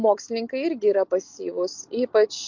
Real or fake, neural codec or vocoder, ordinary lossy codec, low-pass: real; none; MP3, 48 kbps; 7.2 kHz